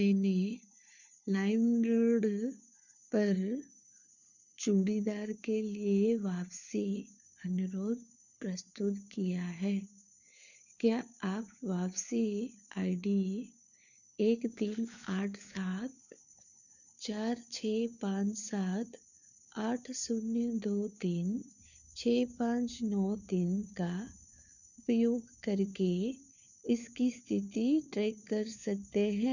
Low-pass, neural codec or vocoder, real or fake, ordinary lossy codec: 7.2 kHz; codec, 16 kHz, 4 kbps, FunCodec, trained on LibriTTS, 50 frames a second; fake; none